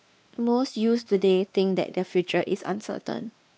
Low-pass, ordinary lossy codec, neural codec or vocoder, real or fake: none; none; codec, 16 kHz, 2 kbps, X-Codec, WavLM features, trained on Multilingual LibriSpeech; fake